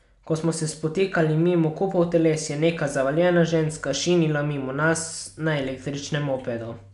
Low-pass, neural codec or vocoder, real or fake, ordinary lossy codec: 10.8 kHz; none; real; MP3, 96 kbps